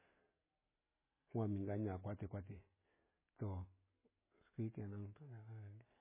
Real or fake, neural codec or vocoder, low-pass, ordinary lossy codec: real; none; 3.6 kHz; MP3, 16 kbps